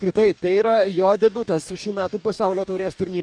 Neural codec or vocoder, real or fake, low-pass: codec, 44.1 kHz, 2.6 kbps, DAC; fake; 9.9 kHz